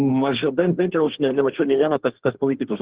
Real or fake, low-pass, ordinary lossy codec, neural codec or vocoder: fake; 3.6 kHz; Opus, 16 kbps; codec, 32 kHz, 1.9 kbps, SNAC